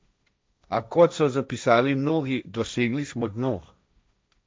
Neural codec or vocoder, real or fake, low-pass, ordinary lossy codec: codec, 16 kHz, 1.1 kbps, Voila-Tokenizer; fake; none; none